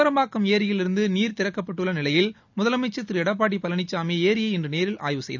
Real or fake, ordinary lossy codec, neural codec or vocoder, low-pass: real; none; none; 7.2 kHz